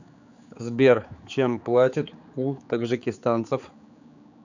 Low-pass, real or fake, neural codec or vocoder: 7.2 kHz; fake; codec, 16 kHz, 4 kbps, X-Codec, HuBERT features, trained on general audio